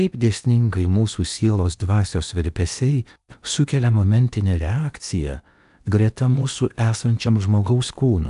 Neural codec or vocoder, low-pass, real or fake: codec, 16 kHz in and 24 kHz out, 0.8 kbps, FocalCodec, streaming, 65536 codes; 10.8 kHz; fake